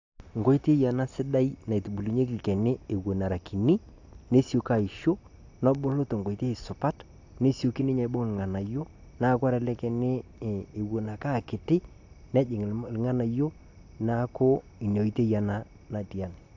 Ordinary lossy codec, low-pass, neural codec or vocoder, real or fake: AAC, 48 kbps; 7.2 kHz; none; real